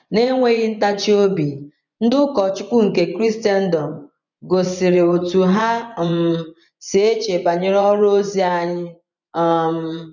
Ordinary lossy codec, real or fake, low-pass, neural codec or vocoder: none; fake; 7.2 kHz; vocoder, 24 kHz, 100 mel bands, Vocos